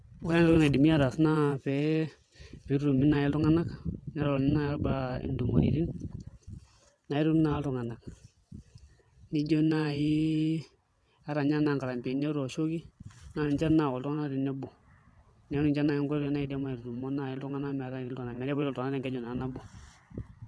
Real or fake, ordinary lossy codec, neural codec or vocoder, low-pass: fake; none; vocoder, 22.05 kHz, 80 mel bands, WaveNeXt; none